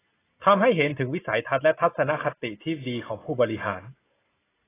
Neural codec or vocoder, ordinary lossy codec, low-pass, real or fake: none; AAC, 16 kbps; 3.6 kHz; real